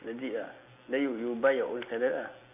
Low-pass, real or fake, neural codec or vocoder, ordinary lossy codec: 3.6 kHz; real; none; AAC, 32 kbps